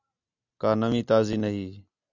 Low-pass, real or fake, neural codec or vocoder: 7.2 kHz; real; none